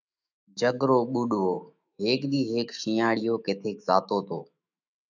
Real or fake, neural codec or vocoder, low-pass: fake; autoencoder, 48 kHz, 128 numbers a frame, DAC-VAE, trained on Japanese speech; 7.2 kHz